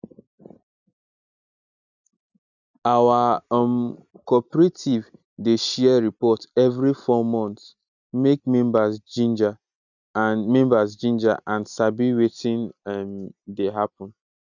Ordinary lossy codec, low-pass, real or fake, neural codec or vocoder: none; 7.2 kHz; real; none